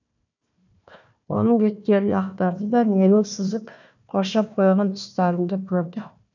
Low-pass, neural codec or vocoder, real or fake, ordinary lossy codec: 7.2 kHz; codec, 16 kHz, 1 kbps, FunCodec, trained on Chinese and English, 50 frames a second; fake; none